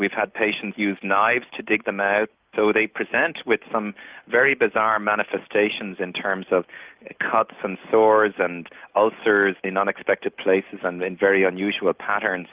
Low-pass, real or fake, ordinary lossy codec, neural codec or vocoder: 3.6 kHz; real; Opus, 32 kbps; none